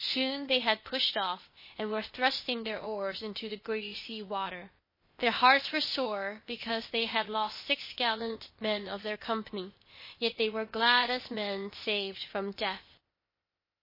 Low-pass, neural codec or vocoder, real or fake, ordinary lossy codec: 5.4 kHz; codec, 16 kHz, 0.8 kbps, ZipCodec; fake; MP3, 24 kbps